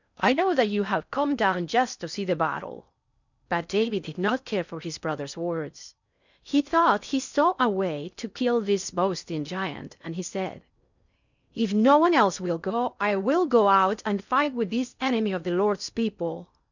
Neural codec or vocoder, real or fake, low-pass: codec, 16 kHz in and 24 kHz out, 0.6 kbps, FocalCodec, streaming, 4096 codes; fake; 7.2 kHz